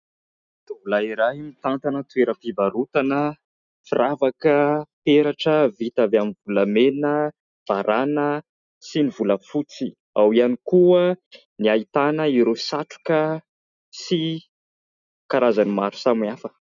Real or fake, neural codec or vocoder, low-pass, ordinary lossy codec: real; none; 7.2 kHz; MP3, 96 kbps